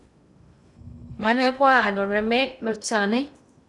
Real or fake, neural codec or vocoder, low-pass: fake; codec, 16 kHz in and 24 kHz out, 0.6 kbps, FocalCodec, streaming, 2048 codes; 10.8 kHz